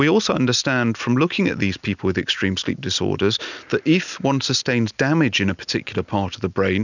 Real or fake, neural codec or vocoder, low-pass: real; none; 7.2 kHz